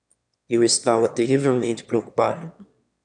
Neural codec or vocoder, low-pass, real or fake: autoencoder, 22.05 kHz, a latent of 192 numbers a frame, VITS, trained on one speaker; 9.9 kHz; fake